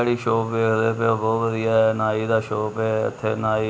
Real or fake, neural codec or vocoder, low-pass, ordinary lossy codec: real; none; none; none